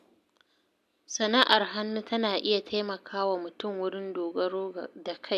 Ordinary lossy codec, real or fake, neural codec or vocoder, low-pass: none; real; none; 14.4 kHz